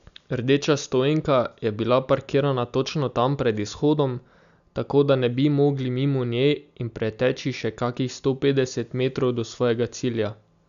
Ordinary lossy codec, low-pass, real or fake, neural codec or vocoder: none; 7.2 kHz; real; none